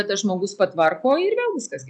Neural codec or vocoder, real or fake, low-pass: none; real; 10.8 kHz